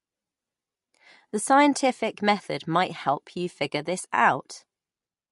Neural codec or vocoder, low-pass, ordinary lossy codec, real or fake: none; 14.4 kHz; MP3, 48 kbps; real